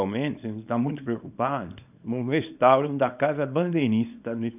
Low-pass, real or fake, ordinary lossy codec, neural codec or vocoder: 3.6 kHz; fake; none; codec, 24 kHz, 0.9 kbps, WavTokenizer, small release